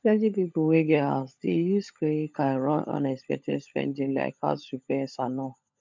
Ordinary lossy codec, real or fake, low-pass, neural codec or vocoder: MP3, 64 kbps; fake; 7.2 kHz; codec, 16 kHz, 16 kbps, FunCodec, trained on LibriTTS, 50 frames a second